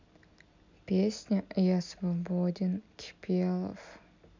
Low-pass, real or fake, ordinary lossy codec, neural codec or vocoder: 7.2 kHz; real; MP3, 64 kbps; none